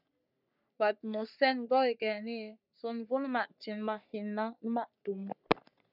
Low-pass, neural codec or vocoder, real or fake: 5.4 kHz; codec, 44.1 kHz, 3.4 kbps, Pupu-Codec; fake